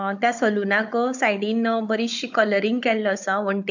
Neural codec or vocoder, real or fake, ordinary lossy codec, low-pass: codec, 16 kHz, 16 kbps, FunCodec, trained on Chinese and English, 50 frames a second; fake; MP3, 64 kbps; 7.2 kHz